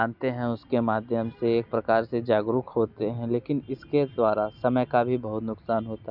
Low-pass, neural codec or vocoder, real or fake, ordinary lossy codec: 5.4 kHz; none; real; none